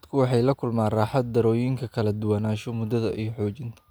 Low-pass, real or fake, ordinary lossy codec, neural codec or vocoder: none; real; none; none